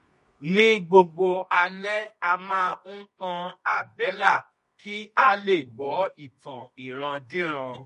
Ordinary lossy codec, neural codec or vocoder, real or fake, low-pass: MP3, 48 kbps; codec, 24 kHz, 0.9 kbps, WavTokenizer, medium music audio release; fake; 10.8 kHz